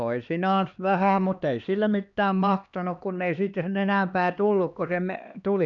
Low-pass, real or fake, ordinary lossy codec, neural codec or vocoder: 7.2 kHz; fake; none; codec, 16 kHz, 2 kbps, X-Codec, HuBERT features, trained on LibriSpeech